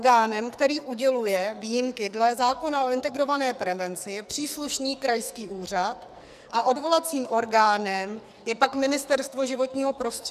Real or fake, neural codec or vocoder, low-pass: fake; codec, 44.1 kHz, 2.6 kbps, SNAC; 14.4 kHz